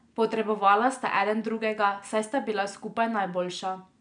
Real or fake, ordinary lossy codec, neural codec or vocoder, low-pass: real; none; none; 9.9 kHz